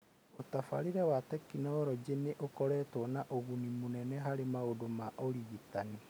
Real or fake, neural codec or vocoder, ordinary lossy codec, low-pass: real; none; none; none